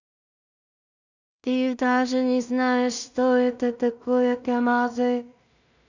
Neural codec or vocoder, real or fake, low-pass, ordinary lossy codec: codec, 16 kHz in and 24 kHz out, 0.4 kbps, LongCat-Audio-Codec, two codebook decoder; fake; 7.2 kHz; none